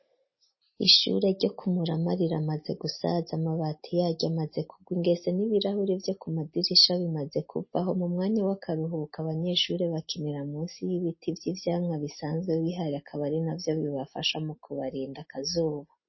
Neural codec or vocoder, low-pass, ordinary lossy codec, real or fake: none; 7.2 kHz; MP3, 24 kbps; real